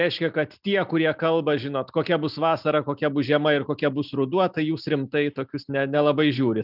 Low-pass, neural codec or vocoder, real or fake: 5.4 kHz; none; real